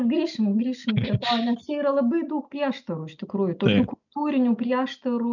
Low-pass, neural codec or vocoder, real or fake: 7.2 kHz; none; real